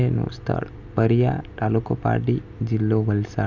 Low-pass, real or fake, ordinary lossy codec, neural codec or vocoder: 7.2 kHz; real; none; none